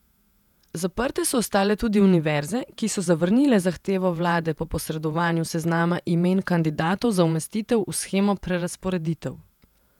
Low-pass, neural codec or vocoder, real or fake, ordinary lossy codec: 19.8 kHz; vocoder, 48 kHz, 128 mel bands, Vocos; fake; none